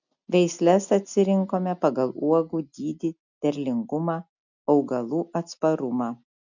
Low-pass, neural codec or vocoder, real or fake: 7.2 kHz; none; real